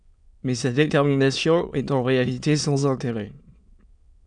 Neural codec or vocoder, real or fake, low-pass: autoencoder, 22.05 kHz, a latent of 192 numbers a frame, VITS, trained on many speakers; fake; 9.9 kHz